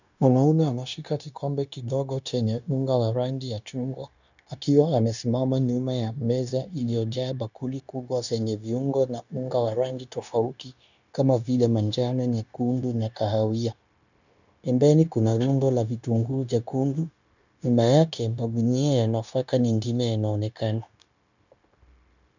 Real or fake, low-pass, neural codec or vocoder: fake; 7.2 kHz; codec, 16 kHz, 0.9 kbps, LongCat-Audio-Codec